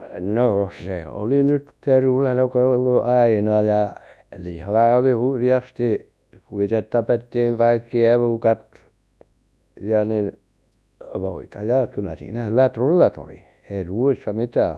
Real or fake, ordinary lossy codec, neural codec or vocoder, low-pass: fake; none; codec, 24 kHz, 0.9 kbps, WavTokenizer, large speech release; none